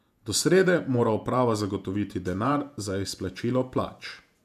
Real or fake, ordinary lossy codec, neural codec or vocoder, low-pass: fake; none; vocoder, 48 kHz, 128 mel bands, Vocos; 14.4 kHz